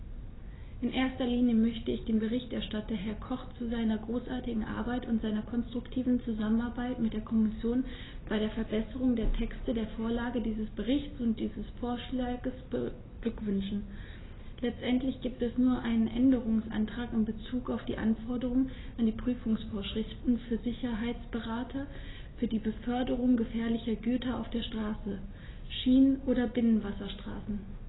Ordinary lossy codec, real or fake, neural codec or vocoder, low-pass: AAC, 16 kbps; real; none; 7.2 kHz